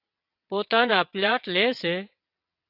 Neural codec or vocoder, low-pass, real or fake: vocoder, 22.05 kHz, 80 mel bands, WaveNeXt; 5.4 kHz; fake